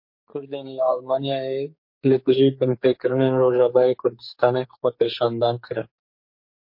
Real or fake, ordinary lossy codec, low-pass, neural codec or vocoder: fake; MP3, 32 kbps; 5.4 kHz; codec, 44.1 kHz, 2.6 kbps, SNAC